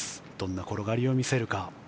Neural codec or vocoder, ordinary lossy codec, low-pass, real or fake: none; none; none; real